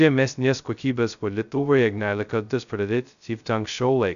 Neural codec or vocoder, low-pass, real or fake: codec, 16 kHz, 0.2 kbps, FocalCodec; 7.2 kHz; fake